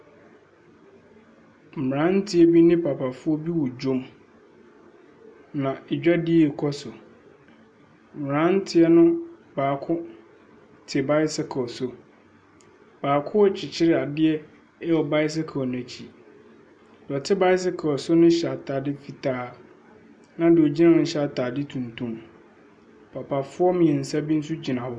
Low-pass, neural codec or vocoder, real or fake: 9.9 kHz; none; real